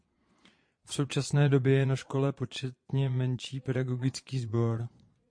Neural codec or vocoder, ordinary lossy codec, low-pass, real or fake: vocoder, 22.05 kHz, 80 mel bands, Vocos; MP3, 48 kbps; 9.9 kHz; fake